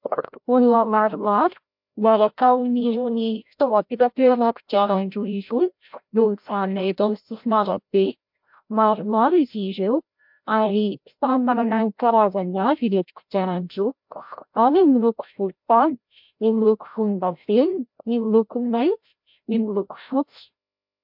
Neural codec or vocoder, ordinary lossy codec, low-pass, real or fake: codec, 16 kHz, 0.5 kbps, FreqCodec, larger model; MP3, 48 kbps; 5.4 kHz; fake